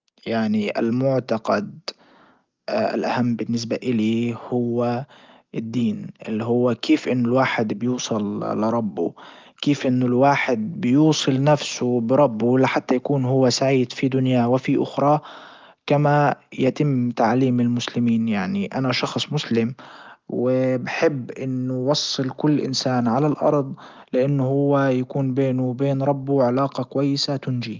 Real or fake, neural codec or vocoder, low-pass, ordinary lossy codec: real; none; 7.2 kHz; Opus, 24 kbps